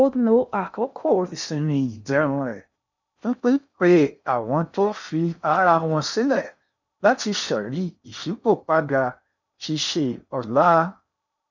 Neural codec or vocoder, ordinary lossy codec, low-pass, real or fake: codec, 16 kHz in and 24 kHz out, 0.6 kbps, FocalCodec, streaming, 2048 codes; none; 7.2 kHz; fake